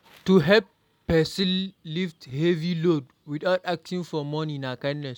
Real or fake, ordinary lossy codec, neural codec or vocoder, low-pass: real; none; none; 19.8 kHz